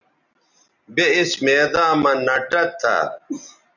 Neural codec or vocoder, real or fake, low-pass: none; real; 7.2 kHz